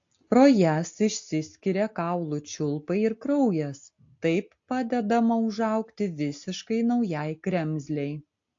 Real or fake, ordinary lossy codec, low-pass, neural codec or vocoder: real; AAC, 48 kbps; 7.2 kHz; none